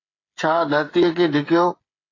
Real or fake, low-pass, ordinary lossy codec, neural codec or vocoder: fake; 7.2 kHz; AAC, 32 kbps; codec, 16 kHz, 8 kbps, FreqCodec, smaller model